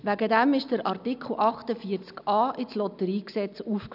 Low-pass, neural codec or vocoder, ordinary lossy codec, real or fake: 5.4 kHz; none; none; real